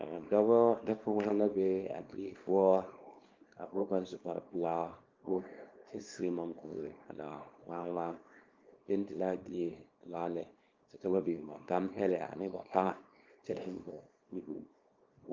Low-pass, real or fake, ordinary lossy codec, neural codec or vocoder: 7.2 kHz; fake; Opus, 32 kbps; codec, 24 kHz, 0.9 kbps, WavTokenizer, small release